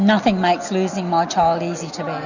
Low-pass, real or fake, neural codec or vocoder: 7.2 kHz; real; none